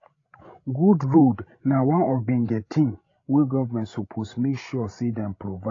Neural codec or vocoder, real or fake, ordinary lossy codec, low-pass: codec, 16 kHz, 16 kbps, FreqCodec, larger model; fake; AAC, 32 kbps; 7.2 kHz